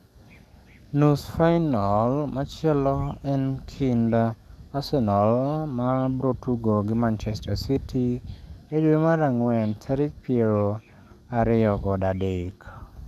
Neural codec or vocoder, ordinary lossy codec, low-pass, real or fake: codec, 44.1 kHz, 7.8 kbps, DAC; none; 14.4 kHz; fake